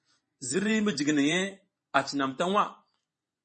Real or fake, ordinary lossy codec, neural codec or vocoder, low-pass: fake; MP3, 32 kbps; vocoder, 24 kHz, 100 mel bands, Vocos; 10.8 kHz